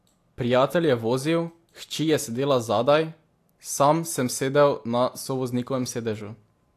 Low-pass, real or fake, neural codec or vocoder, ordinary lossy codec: 14.4 kHz; real; none; AAC, 64 kbps